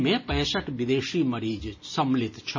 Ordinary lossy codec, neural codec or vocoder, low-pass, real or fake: MP3, 32 kbps; none; 7.2 kHz; real